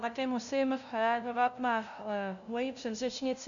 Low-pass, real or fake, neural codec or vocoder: 7.2 kHz; fake; codec, 16 kHz, 0.5 kbps, FunCodec, trained on LibriTTS, 25 frames a second